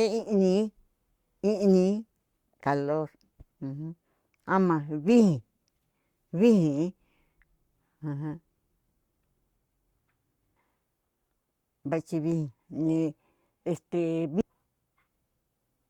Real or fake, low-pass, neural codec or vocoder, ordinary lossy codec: fake; 19.8 kHz; codec, 44.1 kHz, 7.8 kbps, Pupu-Codec; Opus, 64 kbps